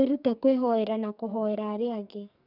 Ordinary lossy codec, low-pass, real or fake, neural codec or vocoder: none; 5.4 kHz; fake; codec, 44.1 kHz, 2.6 kbps, SNAC